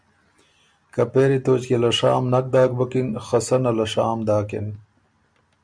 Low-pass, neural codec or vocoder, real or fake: 9.9 kHz; none; real